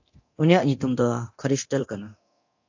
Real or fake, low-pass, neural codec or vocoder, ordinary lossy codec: fake; 7.2 kHz; codec, 24 kHz, 0.9 kbps, DualCodec; AAC, 48 kbps